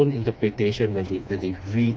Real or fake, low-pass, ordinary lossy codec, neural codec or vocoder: fake; none; none; codec, 16 kHz, 2 kbps, FreqCodec, smaller model